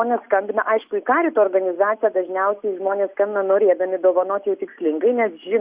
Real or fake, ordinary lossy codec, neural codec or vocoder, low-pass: real; Opus, 64 kbps; none; 3.6 kHz